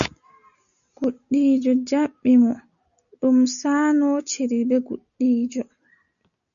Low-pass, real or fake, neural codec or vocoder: 7.2 kHz; real; none